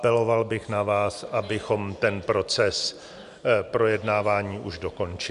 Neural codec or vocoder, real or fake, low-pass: none; real; 10.8 kHz